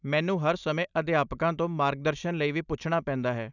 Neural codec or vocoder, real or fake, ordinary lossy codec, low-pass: none; real; none; 7.2 kHz